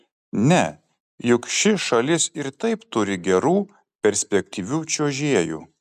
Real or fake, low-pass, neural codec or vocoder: real; 14.4 kHz; none